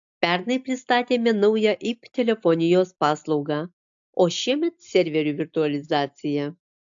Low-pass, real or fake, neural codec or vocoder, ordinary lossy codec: 7.2 kHz; real; none; AAC, 64 kbps